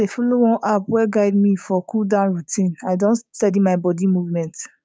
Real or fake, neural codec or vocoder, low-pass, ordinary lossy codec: fake; codec, 16 kHz, 6 kbps, DAC; none; none